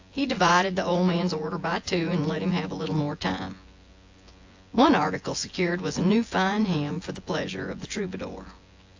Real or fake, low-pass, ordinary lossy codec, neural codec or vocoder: fake; 7.2 kHz; AAC, 48 kbps; vocoder, 24 kHz, 100 mel bands, Vocos